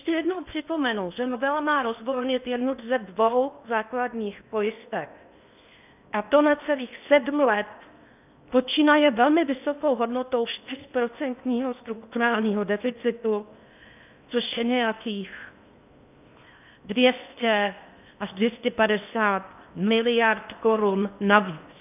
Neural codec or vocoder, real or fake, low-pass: codec, 16 kHz in and 24 kHz out, 0.8 kbps, FocalCodec, streaming, 65536 codes; fake; 3.6 kHz